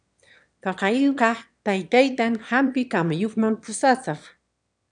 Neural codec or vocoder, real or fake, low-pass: autoencoder, 22.05 kHz, a latent of 192 numbers a frame, VITS, trained on one speaker; fake; 9.9 kHz